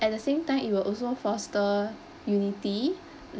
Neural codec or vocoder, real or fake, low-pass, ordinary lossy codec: none; real; none; none